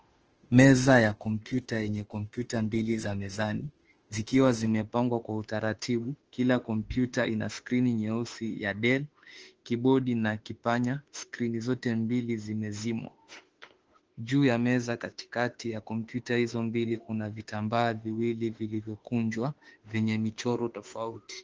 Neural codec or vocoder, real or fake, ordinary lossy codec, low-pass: autoencoder, 48 kHz, 32 numbers a frame, DAC-VAE, trained on Japanese speech; fake; Opus, 16 kbps; 7.2 kHz